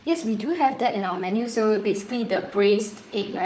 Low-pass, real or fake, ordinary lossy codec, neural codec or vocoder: none; fake; none; codec, 16 kHz, 4 kbps, FunCodec, trained on Chinese and English, 50 frames a second